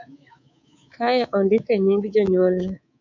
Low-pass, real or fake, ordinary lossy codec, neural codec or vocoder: 7.2 kHz; fake; MP3, 64 kbps; codec, 24 kHz, 3.1 kbps, DualCodec